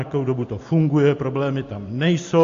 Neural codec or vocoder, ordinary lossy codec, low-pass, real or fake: none; AAC, 48 kbps; 7.2 kHz; real